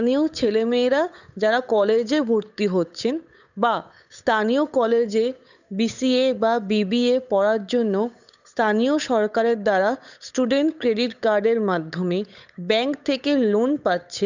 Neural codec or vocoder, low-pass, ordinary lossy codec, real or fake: codec, 16 kHz, 8 kbps, FunCodec, trained on Chinese and English, 25 frames a second; 7.2 kHz; none; fake